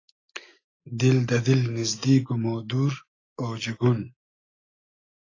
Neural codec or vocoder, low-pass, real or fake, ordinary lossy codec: none; 7.2 kHz; real; AAC, 32 kbps